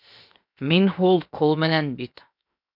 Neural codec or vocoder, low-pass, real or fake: codec, 16 kHz, 0.7 kbps, FocalCodec; 5.4 kHz; fake